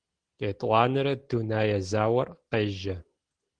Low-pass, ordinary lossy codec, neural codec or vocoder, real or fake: 9.9 kHz; Opus, 16 kbps; none; real